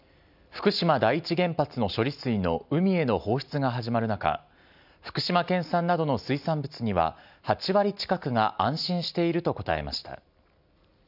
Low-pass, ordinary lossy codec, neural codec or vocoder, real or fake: 5.4 kHz; none; none; real